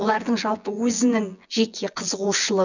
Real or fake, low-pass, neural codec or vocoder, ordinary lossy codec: fake; 7.2 kHz; vocoder, 24 kHz, 100 mel bands, Vocos; none